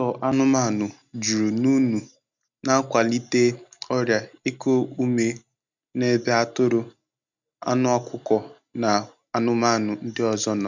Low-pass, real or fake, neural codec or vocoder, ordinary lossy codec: 7.2 kHz; real; none; none